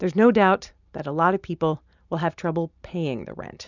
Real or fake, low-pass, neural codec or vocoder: real; 7.2 kHz; none